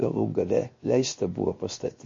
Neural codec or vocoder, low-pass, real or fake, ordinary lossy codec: codec, 16 kHz, 0.7 kbps, FocalCodec; 7.2 kHz; fake; MP3, 32 kbps